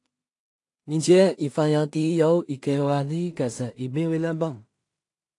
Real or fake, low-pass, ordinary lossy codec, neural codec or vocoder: fake; 10.8 kHz; AAC, 48 kbps; codec, 16 kHz in and 24 kHz out, 0.4 kbps, LongCat-Audio-Codec, two codebook decoder